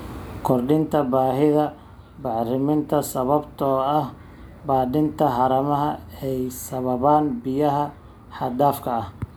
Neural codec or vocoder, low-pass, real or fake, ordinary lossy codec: none; none; real; none